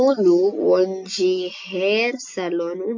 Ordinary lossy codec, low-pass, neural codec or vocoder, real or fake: MP3, 48 kbps; 7.2 kHz; none; real